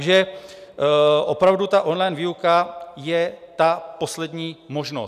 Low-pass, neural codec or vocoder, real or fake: 14.4 kHz; none; real